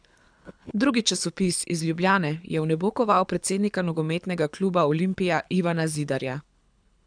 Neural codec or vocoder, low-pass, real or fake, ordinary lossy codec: codec, 24 kHz, 6 kbps, HILCodec; 9.9 kHz; fake; none